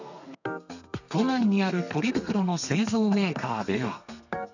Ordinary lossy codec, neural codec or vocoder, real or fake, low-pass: none; codec, 32 kHz, 1.9 kbps, SNAC; fake; 7.2 kHz